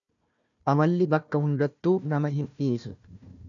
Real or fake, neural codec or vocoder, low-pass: fake; codec, 16 kHz, 1 kbps, FunCodec, trained on Chinese and English, 50 frames a second; 7.2 kHz